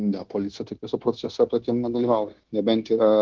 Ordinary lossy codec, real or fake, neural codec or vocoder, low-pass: Opus, 16 kbps; fake; codec, 16 kHz, 0.9 kbps, LongCat-Audio-Codec; 7.2 kHz